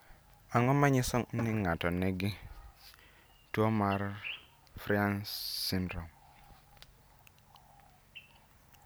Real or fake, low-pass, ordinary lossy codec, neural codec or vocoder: real; none; none; none